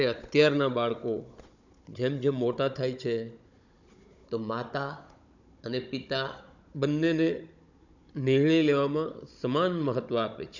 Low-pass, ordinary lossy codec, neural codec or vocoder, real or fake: 7.2 kHz; none; codec, 16 kHz, 16 kbps, FunCodec, trained on Chinese and English, 50 frames a second; fake